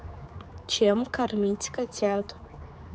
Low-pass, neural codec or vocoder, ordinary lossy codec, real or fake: none; codec, 16 kHz, 4 kbps, X-Codec, HuBERT features, trained on general audio; none; fake